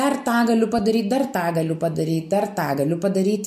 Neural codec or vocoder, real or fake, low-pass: none; real; 14.4 kHz